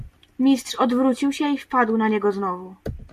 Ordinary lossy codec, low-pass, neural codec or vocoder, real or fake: MP3, 96 kbps; 14.4 kHz; none; real